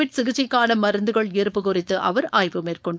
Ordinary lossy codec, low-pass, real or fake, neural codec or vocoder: none; none; fake; codec, 16 kHz, 4.8 kbps, FACodec